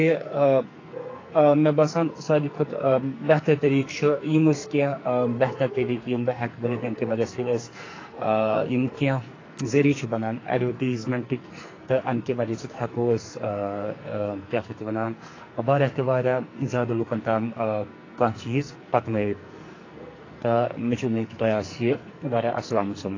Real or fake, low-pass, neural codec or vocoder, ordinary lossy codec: fake; 7.2 kHz; codec, 44.1 kHz, 2.6 kbps, SNAC; AAC, 32 kbps